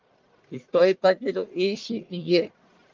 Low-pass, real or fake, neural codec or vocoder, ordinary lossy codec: 7.2 kHz; fake; codec, 44.1 kHz, 1.7 kbps, Pupu-Codec; Opus, 32 kbps